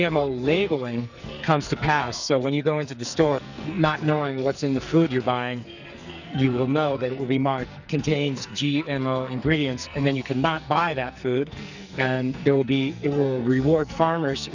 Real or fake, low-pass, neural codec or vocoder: fake; 7.2 kHz; codec, 44.1 kHz, 2.6 kbps, SNAC